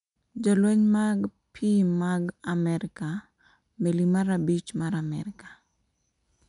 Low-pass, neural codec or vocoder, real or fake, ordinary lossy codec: 10.8 kHz; none; real; none